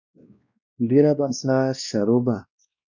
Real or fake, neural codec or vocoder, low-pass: fake; codec, 16 kHz, 1 kbps, X-Codec, WavLM features, trained on Multilingual LibriSpeech; 7.2 kHz